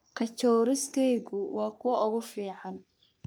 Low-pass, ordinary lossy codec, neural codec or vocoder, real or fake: none; none; codec, 44.1 kHz, 3.4 kbps, Pupu-Codec; fake